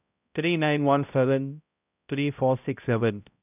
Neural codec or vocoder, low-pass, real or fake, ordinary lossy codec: codec, 16 kHz, 0.5 kbps, X-Codec, HuBERT features, trained on balanced general audio; 3.6 kHz; fake; none